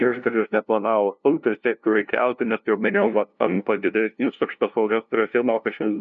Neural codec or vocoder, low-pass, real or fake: codec, 16 kHz, 0.5 kbps, FunCodec, trained on LibriTTS, 25 frames a second; 7.2 kHz; fake